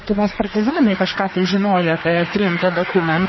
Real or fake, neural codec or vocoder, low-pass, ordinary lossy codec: fake; codec, 24 kHz, 1 kbps, SNAC; 7.2 kHz; MP3, 24 kbps